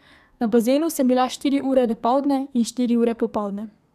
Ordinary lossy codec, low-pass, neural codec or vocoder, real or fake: none; 14.4 kHz; codec, 32 kHz, 1.9 kbps, SNAC; fake